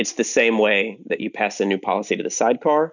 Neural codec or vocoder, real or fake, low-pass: none; real; 7.2 kHz